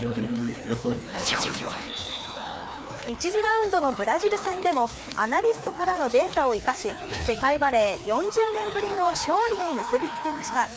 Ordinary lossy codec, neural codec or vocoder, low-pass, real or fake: none; codec, 16 kHz, 2 kbps, FreqCodec, larger model; none; fake